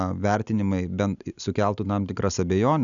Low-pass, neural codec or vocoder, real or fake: 7.2 kHz; none; real